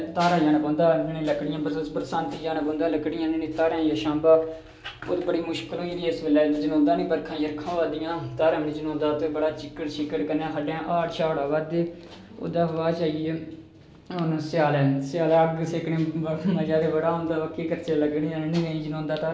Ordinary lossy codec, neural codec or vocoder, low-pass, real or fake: none; none; none; real